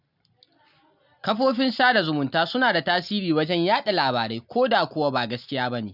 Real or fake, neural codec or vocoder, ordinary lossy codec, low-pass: real; none; none; 5.4 kHz